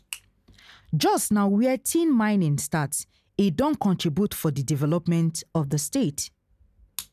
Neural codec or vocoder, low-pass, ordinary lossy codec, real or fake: none; 14.4 kHz; none; real